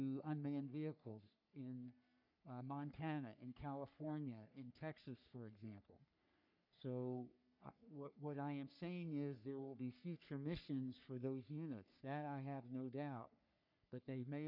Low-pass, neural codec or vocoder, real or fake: 5.4 kHz; codec, 44.1 kHz, 3.4 kbps, Pupu-Codec; fake